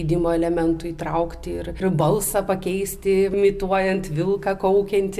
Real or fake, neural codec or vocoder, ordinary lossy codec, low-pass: real; none; MP3, 96 kbps; 14.4 kHz